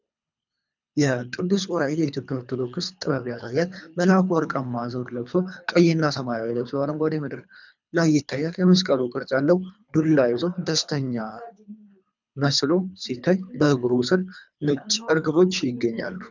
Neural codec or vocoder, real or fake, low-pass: codec, 24 kHz, 3 kbps, HILCodec; fake; 7.2 kHz